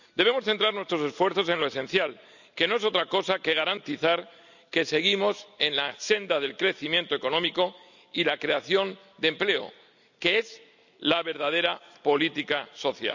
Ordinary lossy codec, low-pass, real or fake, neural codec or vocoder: none; 7.2 kHz; real; none